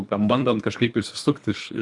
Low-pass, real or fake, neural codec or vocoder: 10.8 kHz; fake; codec, 24 kHz, 3 kbps, HILCodec